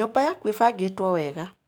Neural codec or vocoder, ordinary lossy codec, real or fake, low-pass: codec, 44.1 kHz, 7.8 kbps, DAC; none; fake; none